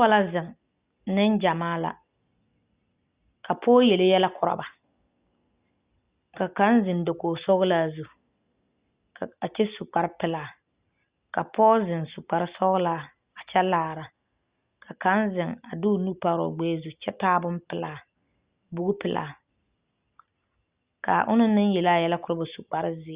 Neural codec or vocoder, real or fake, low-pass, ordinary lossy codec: none; real; 3.6 kHz; Opus, 64 kbps